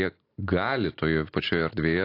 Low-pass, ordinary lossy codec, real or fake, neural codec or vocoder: 5.4 kHz; AAC, 32 kbps; real; none